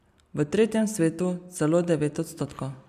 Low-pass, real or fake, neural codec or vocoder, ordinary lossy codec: 14.4 kHz; real; none; none